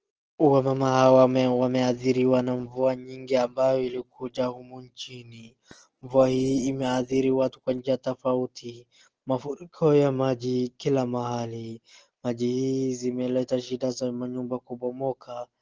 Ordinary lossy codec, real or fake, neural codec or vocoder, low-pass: Opus, 16 kbps; real; none; 7.2 kHz